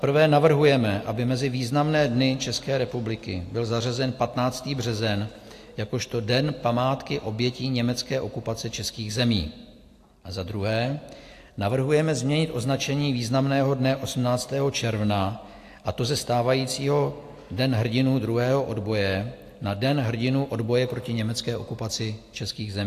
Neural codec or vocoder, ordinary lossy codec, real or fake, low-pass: none; AAC, 64 kbps; real; 14.4 kHz